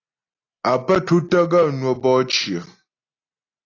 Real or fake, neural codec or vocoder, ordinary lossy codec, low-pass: real; none; AAC, 32 kbps; 7.2 kHz